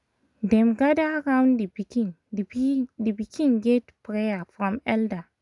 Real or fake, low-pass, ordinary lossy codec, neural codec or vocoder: real; 10.8 kHz; none; none